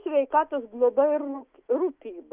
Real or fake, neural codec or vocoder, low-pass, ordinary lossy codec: fake; autoencoder, 48 kHz, 128 numbers a frame, DAC-VAE, trained on Japanese speech; 3.6 kHz; Opus, 24 kbps